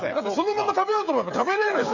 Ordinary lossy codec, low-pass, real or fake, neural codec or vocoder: none; 7.2 kHz; fake; codec, 16 kHz, 8 kbps, FreqCodec, smaller model